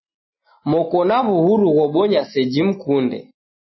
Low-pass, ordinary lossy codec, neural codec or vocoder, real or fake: 7.2 kHz; MP3, 24 kbps; none; real